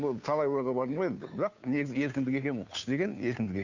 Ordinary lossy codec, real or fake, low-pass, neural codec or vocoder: none; fake; 7.2 kHz; codec, 16 kHz, 2 kbps, FunCodec, trained on Chinese and English, 25 frames a second